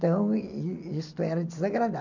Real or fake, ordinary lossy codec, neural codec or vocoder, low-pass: real; none; none; 7.2 kHz